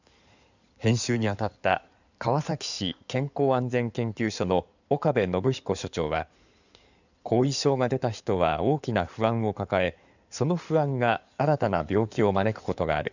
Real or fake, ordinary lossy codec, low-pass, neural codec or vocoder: fake; none; 7.2 kHz; codec, 16 kHz in and 24 kHz out, 2.2 kbps, FireRedTTS-2 codec